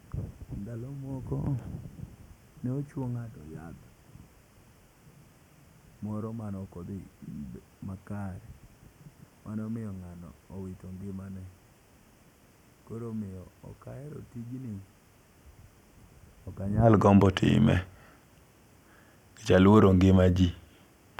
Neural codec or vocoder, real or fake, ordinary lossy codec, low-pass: none; real; none; 19.8 kHz